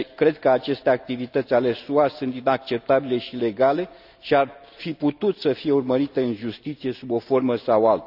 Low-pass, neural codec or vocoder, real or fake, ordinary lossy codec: 5.4 kHz; none; real; none